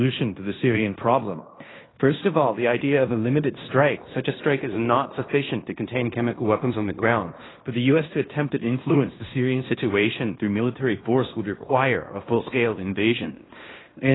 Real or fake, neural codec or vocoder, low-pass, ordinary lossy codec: fake; codec, 16 kHz in and 24 kHz out, 0.9 kbps, LongCat-Audio-Codec, fine tuned four codebook decoder; 7.2 kHz; AAC, 16 kbps